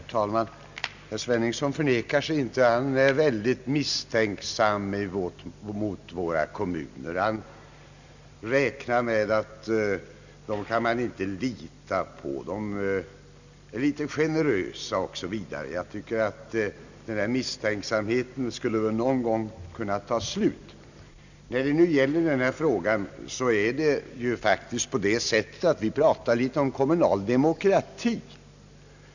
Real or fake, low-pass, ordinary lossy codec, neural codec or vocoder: real; 7.2 kHz; none; none